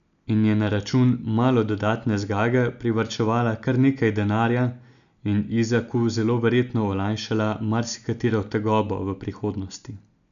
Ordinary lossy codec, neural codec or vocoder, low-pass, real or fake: none; none; 7.2 kHz; real